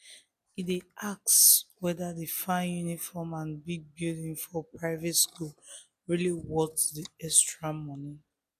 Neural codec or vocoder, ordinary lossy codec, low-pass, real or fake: none; none; 14.4 kHz; real